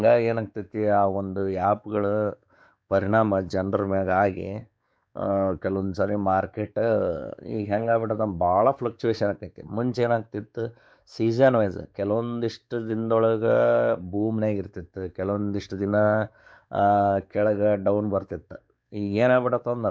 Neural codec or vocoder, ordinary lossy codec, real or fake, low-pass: codec, 16 kHz, 4 kbps, X-Codec, WavLM features, trained on Multilingual LibriSpeech; none; fake; none